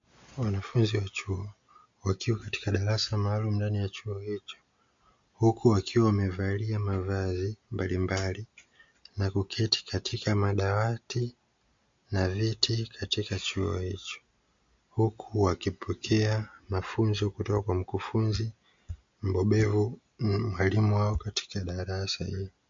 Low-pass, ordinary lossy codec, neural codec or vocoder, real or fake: 7.2 kHz; MP3, 48 kbps; none; real